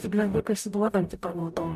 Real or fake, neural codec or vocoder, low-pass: fake; codec, 44.1 kHz, 0.9 kbps, DAC; 14.4 kHz